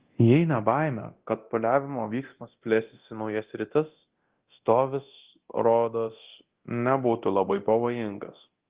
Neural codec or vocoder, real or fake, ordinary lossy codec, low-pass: codec, 24 kHz, 0.9 kbps, DualCodec; fake; Opus, 16 kbps; 3.6 kHz